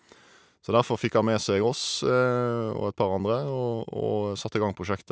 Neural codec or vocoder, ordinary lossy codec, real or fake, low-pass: none; none; real; none